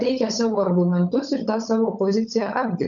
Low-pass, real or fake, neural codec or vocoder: 7.2 kHz; fake; codec, 16 kHz, 16 kbps, FunCodec, trained on LibriTTS, 50 frames a second